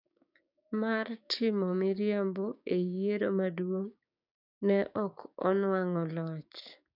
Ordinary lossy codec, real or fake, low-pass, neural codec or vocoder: none; fake; 5.4 kHz; codec, 16 kHz, 6 kbps, DAC